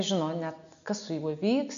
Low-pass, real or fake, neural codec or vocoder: 7.2 kHz; real; none